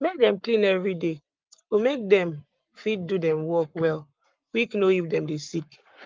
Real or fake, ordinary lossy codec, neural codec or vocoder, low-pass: fake; Opus, 24 kbps; codec, 44.1 kHz, 7.8 kbps, Pupu-Codec; 7.2 kHz